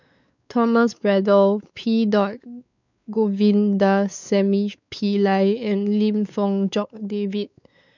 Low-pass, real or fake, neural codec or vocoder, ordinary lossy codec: 7.2 kHz; fake; codec, 16 kHz, 4 kbps, X-Codec, WavLM features, trained on Multilingual LibriSpeech; none